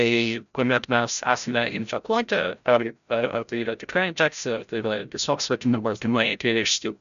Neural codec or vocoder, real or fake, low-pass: codec, 16 kHz, 0.5 kbps, FreqCodec, larger model; fake; 7.2 kHz